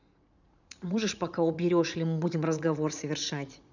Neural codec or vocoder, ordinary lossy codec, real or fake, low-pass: vocoder, 44.1 kHz, 80 mel bands, Vocos; none; fake; 7.2 kHz